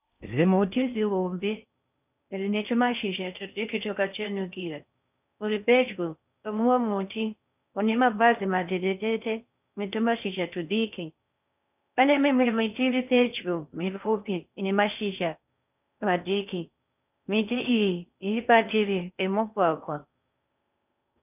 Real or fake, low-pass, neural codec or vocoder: fake; 3.6 kHz; codec, 16 kHz in and 24 kHz out, 0.6 kbps, FocalCodec, streaming, 4096 codes